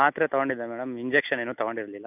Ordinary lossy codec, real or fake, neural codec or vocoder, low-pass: none; real; none; 3.6 kHz